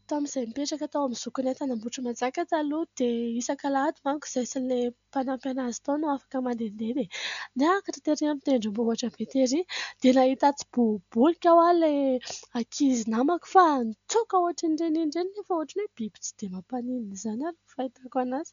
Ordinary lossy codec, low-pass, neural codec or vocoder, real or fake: MP3, 96 kbps; 7.2 kHz; none; real